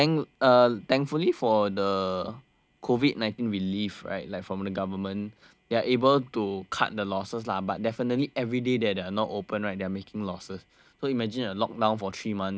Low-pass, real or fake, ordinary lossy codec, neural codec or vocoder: none; real; none; none